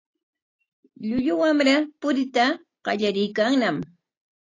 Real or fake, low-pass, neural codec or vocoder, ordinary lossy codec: real; 7.2 kHz; none; AAC, 32 kbps